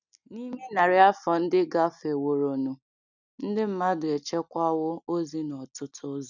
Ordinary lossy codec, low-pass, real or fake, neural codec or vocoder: none; 7.2 kHz; real; none